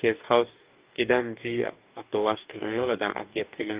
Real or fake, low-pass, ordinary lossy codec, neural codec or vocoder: fake; 3.6 kHz; Opus, 24 kbps; codec, 44.1 kHz, 2.6 kbps, DAC